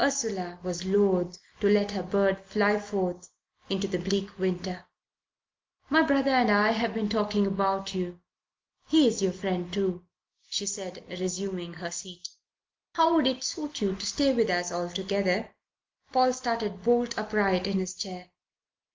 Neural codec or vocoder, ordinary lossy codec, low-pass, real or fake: none; Opus, 32 kbps; 7.2 kHz; real